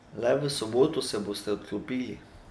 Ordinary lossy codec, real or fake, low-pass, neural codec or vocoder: none; real; none; none